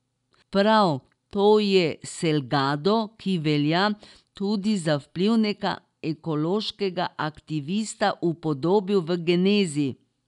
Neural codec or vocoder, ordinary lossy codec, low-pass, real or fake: none; none; 10.8 kHz; real